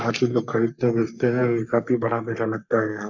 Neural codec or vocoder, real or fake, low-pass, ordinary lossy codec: codec, 44.1 kHz, 3.4 kbps, Pupu-Codec; fake; 7.2 kHz; none